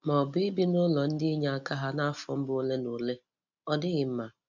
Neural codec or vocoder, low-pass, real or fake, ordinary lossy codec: none; 7.2 kHz; real; none